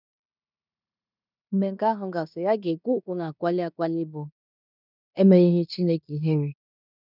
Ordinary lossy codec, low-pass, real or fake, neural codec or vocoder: none; 5.4 kHz; fake; codec, 16 kHz in and 24 kHz out, 0.9 kbps, LongCat-Audio-Codec, fine tuned four codebook decoder